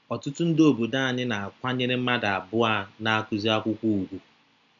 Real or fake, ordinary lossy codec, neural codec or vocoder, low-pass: real; none; none; 7.2 kHz